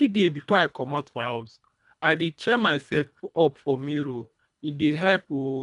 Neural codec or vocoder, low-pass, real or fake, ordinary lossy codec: codec, 24 kHz, 1.5 kbps, HILCodec; 10.8 kHz; fake; none